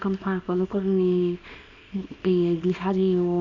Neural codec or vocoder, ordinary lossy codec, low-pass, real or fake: codec, 24 kHz, 0.9 kbps, WavTokenizer, small release; none; 7.2 kHz; fake